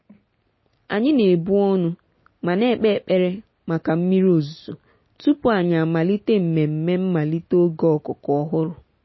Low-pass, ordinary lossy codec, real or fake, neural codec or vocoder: 7.2 kHz; MP3, 24 kbps; real; none